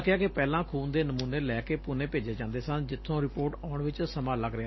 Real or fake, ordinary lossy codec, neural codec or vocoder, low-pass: real; MP3, 24 kbps; none; 7.2 kHz